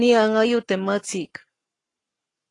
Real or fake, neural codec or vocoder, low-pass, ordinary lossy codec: fake; codec, 44.1 kHz, 7.8 kbps, Pupu-Codec; 10.8 kHz; AAC, 32 kbps